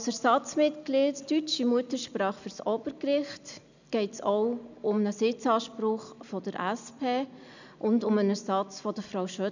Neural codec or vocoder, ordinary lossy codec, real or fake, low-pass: none; none; real; 7.2 kHz